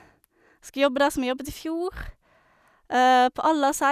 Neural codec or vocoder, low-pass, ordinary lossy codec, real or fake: none; 14.4 kHz; none; real